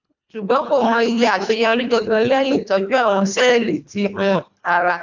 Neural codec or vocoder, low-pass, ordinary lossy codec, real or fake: codec, 24 kHz, 1.5 kbps, HILCodec; 7.2 kHz; none; fake